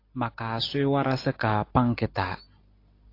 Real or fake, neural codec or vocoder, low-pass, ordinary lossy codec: real; none; 5.4 kHz; AAC, 32 kbps